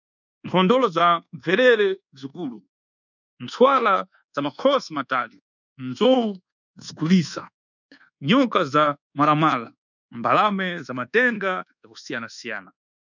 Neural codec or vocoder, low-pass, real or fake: codec, 24 kHz, 1.2 kbps, DualCodec; 7.2 kHz; fake